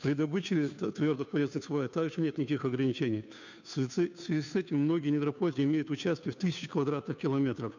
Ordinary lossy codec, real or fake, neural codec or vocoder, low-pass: none; fake; codec, 16 kHz, 2 kbps, FunCodec, trained on Chinese and English, 25 frames a second; 7.2 kHz